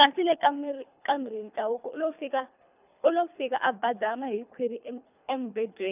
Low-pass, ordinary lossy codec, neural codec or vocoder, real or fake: 3.6 kHz; none; codec, 24 kHz, 3 kbps, HILCodec; fake